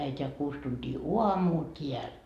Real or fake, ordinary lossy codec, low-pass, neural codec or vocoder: real; AAC, 96 kbps; 14.4 kHz; none